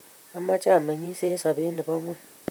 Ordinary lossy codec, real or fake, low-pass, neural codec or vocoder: none; fake; none; vocoder, 44.1 kHz, 128 mel bands, Pupu-Vocoder